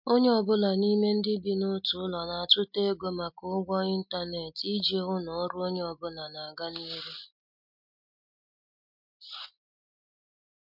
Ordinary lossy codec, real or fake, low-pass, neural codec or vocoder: MP3, 48 kbps; real; 5.4 kHz; none